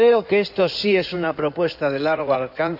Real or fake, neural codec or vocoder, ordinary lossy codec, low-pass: fake; codec, 16 kHz in and 24 kHz out, 2.2 kbps, FireRedTTS-2 codec; none; 5.4 kHz